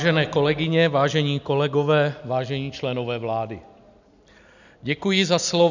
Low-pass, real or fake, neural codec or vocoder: 7.2 kHz; real; none